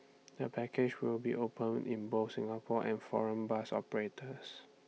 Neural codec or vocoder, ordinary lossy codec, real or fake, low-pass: none; none; real; none